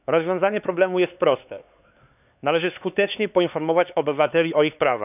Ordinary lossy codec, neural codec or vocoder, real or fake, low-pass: none; codec, 16 kHz, 4 kbps, X-Codec, HuBERT features, trained on LibriSpeech; fake; 3.6 kHz